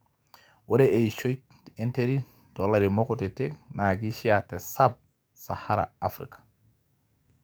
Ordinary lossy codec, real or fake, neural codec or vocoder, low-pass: none; fake; codec, 44.1 kHz, 7.8 kbps, DAC; none